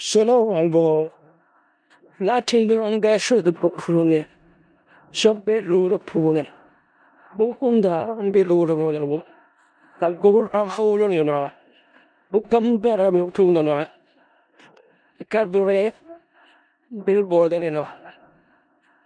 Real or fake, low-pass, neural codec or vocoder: fake; 9.9 kHz; codec, 16 kHz in and 24 kHz out, 0.4 kbps, LongCat-Audio-Codec, four codebook decoder